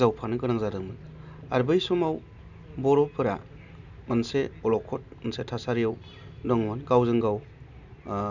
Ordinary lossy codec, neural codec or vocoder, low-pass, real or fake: none; none; 7.2 kHz; real